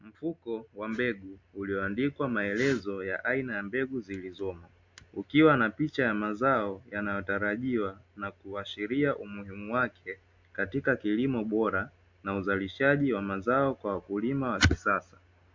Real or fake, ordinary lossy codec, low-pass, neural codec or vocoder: real; MP3, 64 kbps; 7.2 kHz; none